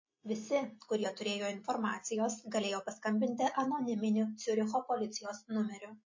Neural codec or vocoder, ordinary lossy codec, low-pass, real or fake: none; MP3, 32 kbps; 7.2 kHz; real